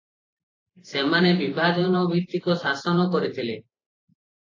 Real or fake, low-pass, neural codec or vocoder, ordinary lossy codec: fake; 7.2 kHz; vocoder, 44.1 kHz, 128 mel bands every 512 samples, BigVGAN v2; AAC, 32 kbps